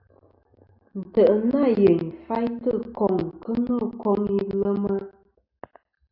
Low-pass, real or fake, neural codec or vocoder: 5.4 kHz; real; none